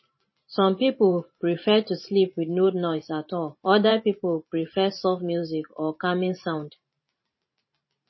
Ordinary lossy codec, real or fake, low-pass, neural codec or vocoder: MP3, 24 kbps; fake; 7.2 kHz; vocoder, 44.1 kHz, 128 mel bands every 512 samples, BigVGAN v2